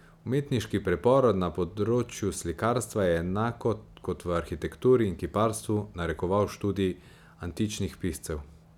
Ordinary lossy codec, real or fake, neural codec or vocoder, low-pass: none; real; none; 19.8 kHz